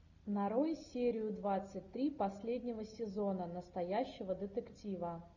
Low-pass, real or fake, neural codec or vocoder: 7.2 kHz; real; none